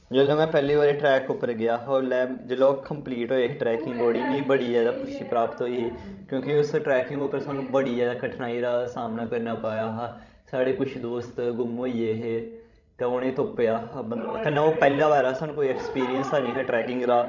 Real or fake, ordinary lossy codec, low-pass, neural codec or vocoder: fake; none; 7.2 kHz; codec, 16 kHz, 16 kbps, FreqCodec, larger model